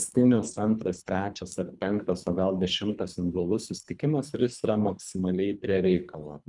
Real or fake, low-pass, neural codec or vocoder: fake; 10.8 kHz; codec, 24 kHz, 3 kbps, HILCodec